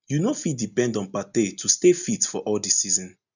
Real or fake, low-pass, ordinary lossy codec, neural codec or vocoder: real; 7.2 kHz; none; none